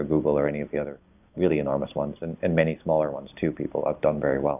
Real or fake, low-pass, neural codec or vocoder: real; 3.6 kHz; none